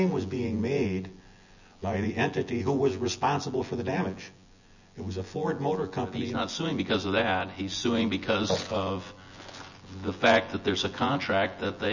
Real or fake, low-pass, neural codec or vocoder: fake; 7.2 kHz; vocoder, 24 kHz, 100 mel bands, Vocos